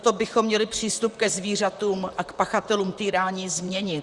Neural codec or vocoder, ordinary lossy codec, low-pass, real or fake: vocoder, 44.1 kHz, 128 mel bands, Pupu-Vocoder; Opus, 64 kbps; 10.8 kHz; fake